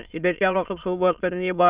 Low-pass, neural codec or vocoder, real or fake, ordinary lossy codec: 3.6 kHz; autoencoder, 22.05 kHz, a latent of 192 numbers a frame, VITS, trained on many speakers; fake; Opus, 64 kbps